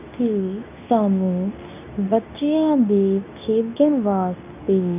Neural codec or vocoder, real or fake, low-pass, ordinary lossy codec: codec, 24 kHz, 0.9 kbps, WavTokenizer, medium speech release version 2; fake; 3.6 kHz; none